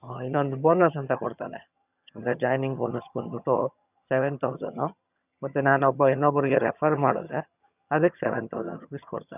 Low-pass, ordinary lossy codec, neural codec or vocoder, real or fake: 3.6 kHz; none; vocoder, 22.05 kHz, 80 mel bands, HiFi-GAN; fake